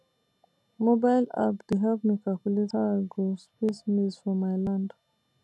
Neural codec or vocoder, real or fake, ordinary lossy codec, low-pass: none; real; none; none